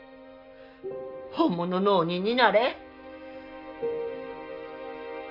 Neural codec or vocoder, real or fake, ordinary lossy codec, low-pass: none; real; none; 5.4 kHz